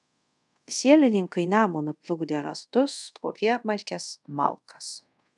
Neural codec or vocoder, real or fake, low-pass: codec, 24 kHz, 0.5 kbps, DualCodec; fake; 10.8 kHz